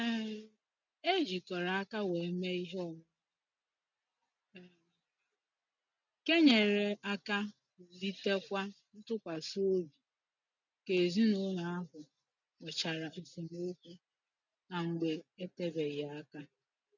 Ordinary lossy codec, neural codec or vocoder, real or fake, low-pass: none; none; real; 7.2 kHz